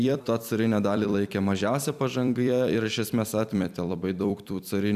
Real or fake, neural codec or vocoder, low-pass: fake; vocoder, 44.1 kHz, 128 mel bands every 256 samples, BigVGAN v2; 14.4 kHz